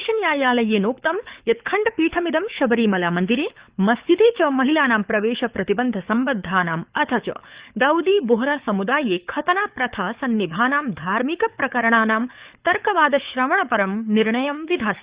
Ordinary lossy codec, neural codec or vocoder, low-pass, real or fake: Opus, 64 kbps; codec, 24 kHz, 6 kbps, HILCodec; 3.6 kHz; fake